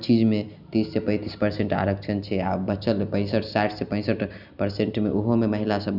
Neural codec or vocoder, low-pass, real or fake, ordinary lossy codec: none; 5.4 kHz; real; none